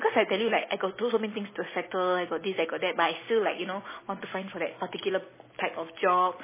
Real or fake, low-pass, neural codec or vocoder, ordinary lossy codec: real; 3.6 kHz; none; MP3, 16 kbps